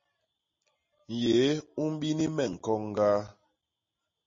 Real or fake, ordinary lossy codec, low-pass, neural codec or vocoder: real; MP3, 32 kbps; 7.2 kHz; none